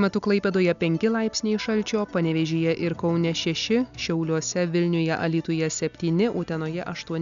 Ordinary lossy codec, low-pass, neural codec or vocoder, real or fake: MP3, 96 kbps; 7.2 kHz; none; real